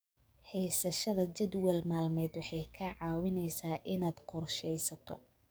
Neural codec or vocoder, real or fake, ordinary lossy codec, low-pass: codec, 44.1 kHz, 7.8 kbps, DAC; fake; none; none